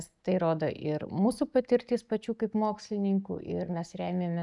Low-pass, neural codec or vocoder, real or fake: 10.8 kHz; none; real